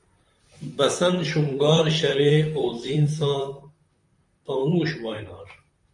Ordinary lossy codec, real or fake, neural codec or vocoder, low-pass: MP3, 48 kbps; fake; vocoder, 44.1 kHz, 128 mel bands, Pupu-Vocoder; 10.8 kHz